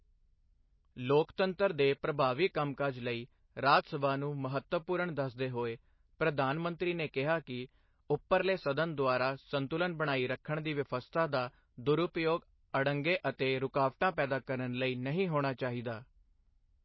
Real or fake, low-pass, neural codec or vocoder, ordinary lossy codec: real; 7.2 kHz; none; MP3, 24 kbps